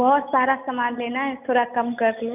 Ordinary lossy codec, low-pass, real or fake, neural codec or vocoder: none; 3.6 kHz; real; none